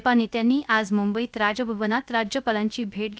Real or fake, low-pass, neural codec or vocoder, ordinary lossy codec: fake; none; codec, 16 kHz, 0.3 kbps, FocalCodec; none